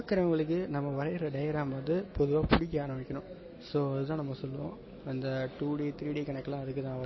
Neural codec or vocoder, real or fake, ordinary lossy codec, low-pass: none; real; MP3, 24 kbps; 7.2 kHz